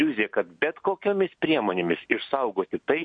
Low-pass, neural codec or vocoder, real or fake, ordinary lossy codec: 9.9 kHz; none; real; MP3, 48 kbps